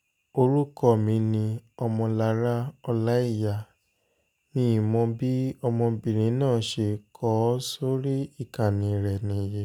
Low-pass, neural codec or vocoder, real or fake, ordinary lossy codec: 19.8 kHz; none; real; none